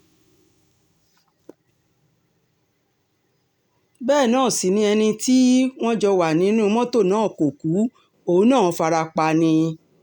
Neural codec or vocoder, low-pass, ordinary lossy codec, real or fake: none; 19.8 kHz; none; real